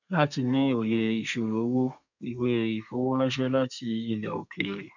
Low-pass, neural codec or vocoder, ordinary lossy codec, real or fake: 7.2 kHz; codec, 32 kHz, 1.9 kbps, SNAC; none; fake